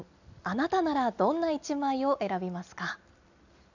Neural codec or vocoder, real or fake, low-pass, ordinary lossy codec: none; real; 7.2 kHz; none